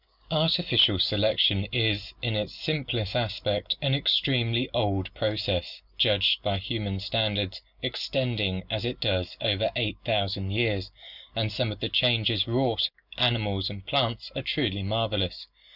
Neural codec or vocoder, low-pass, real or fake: none; 5.4 kHz; real